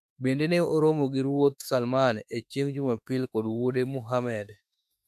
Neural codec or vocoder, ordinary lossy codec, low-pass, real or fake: autoencoder, 48 kHz, 32 numbers a frame, DAC-VAE, trained on Japanese speech; MP3, 96 kbps; 14.4 kHz; fake